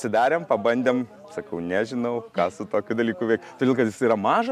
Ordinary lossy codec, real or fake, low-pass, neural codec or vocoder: MP3, 96 kbps; real; 14.4 kHz; none